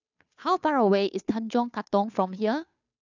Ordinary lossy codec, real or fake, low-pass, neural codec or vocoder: none; fake; 7.2 kHz; codec, 16 kHz, 2 kbps, FunCodec, trained on Chinese and English, 25 frames a second